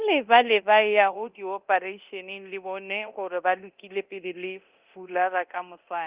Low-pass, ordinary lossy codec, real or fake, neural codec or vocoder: 3.6 kHz; Opus, 32 kbps; fake; codec, 24 kHz, 0.9 kbps, DualCodec